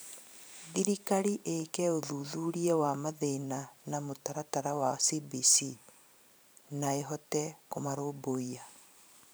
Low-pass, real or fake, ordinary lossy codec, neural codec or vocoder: none; fake; none; vocoder, 44.1 kHz, 128 mel bands every 512 samples, BigVGAN v2